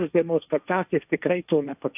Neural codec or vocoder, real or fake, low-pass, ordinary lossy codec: codec, 16 kHz, 1.1 kbps, Voila-Tokenizer; fake; 3.6 kHz; AAC, 32 kbps